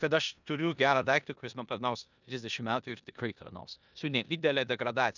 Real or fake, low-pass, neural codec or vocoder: fake; 7.2 kHz; codec, 16 kHz in and 24 kHz out, 0.9 kbps, LongCat-Audio-Codec, four codebook decoder